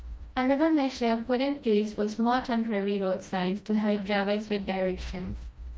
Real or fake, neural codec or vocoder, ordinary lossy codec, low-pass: fake; codec, 16 kHz, 1 kbps, FreqCodec, smaller model; none; none